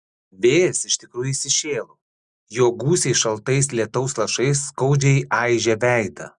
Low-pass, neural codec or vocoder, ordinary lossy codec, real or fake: 10.8 kHz; none; Opus, 64 kbps; real